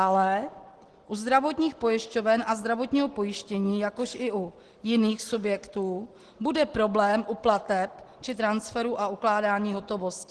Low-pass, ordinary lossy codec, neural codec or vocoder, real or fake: 10.8 kHz; Opus, 16 kbps; none; real